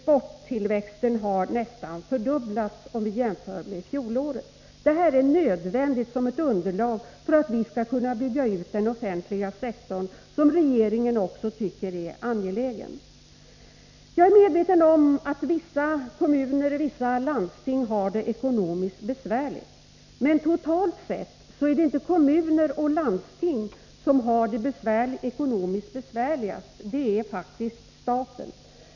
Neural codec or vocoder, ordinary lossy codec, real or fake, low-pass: none; none; real; 7.2 kHz